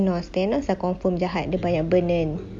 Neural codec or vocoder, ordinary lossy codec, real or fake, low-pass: none; none; real; 9.9 kHz